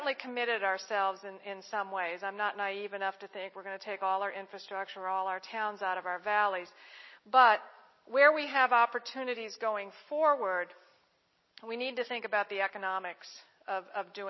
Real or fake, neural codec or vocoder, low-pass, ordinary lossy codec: real; none; 7.2 kHz; MP3, 24 kbps